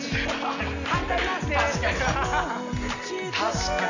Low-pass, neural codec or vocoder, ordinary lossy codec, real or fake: 7.2 kHz; none; none; real